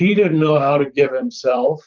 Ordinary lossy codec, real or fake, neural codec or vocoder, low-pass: Opus, 24 kbps; fake; vocoder, 44.1 kHz, 128 mel bands, Pupu-Vocoder; 7.2 kHz